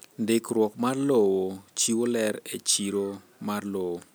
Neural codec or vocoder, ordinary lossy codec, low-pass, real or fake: none; none; none; real